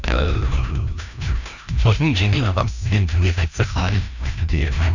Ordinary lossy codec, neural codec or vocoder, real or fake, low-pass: none; codec, 16 kHz, 1 kbps, FreqCodec, larger model; fake; 7.2 kHz